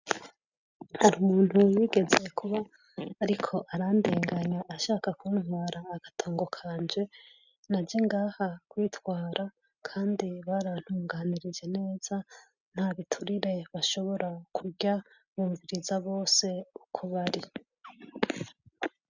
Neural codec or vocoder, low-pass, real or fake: none; 7.2 kHz; real